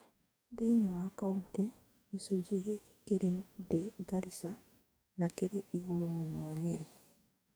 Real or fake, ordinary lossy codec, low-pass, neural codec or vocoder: fake; none; none; codec, 44.1 kHz, 2.6 kbps, DAC